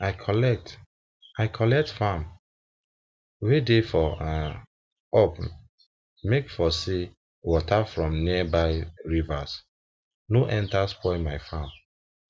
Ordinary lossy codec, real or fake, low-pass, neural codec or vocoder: none; real; none; none